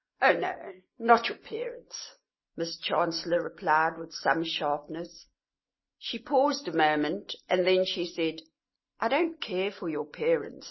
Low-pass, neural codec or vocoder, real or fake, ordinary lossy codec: 7.2 kHz; none; real; MP3, 24 kbps